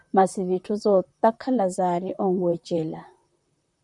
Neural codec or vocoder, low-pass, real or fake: vocoder, 44.1 kHz, 128 mel bands, Pupu-Vocoder; 10.8 kHz; fake